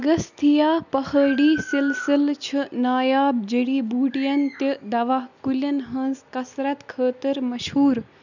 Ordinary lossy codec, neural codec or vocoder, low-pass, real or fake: none; none; 7.2 kHz; real